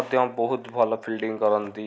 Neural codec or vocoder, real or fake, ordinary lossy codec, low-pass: none; real; none; none